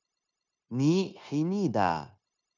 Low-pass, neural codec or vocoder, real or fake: 7.2 kHz; codec, 16 kHz, 0.9 kbps, LongCat-Audio-Codec; fake